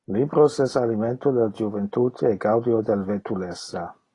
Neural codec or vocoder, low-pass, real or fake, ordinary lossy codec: none; 10.8 kHz; real; AAC, 32 kbps